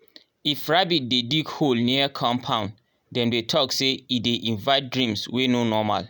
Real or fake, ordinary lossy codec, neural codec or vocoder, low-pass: real; none; none; none